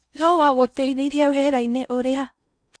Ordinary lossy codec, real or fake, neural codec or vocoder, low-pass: none; fake; codec, 16 kHz in and 24 kHz out, 0.6 kbps, FocalCodec, streaming, 4096 codes; 9.9 kHz